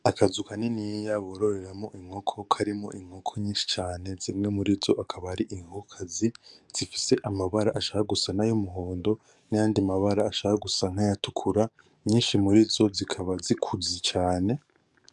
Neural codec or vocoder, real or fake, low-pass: codec, 44.1 kHz, 7.8 kbps, DAC; fake; 10.8 kHz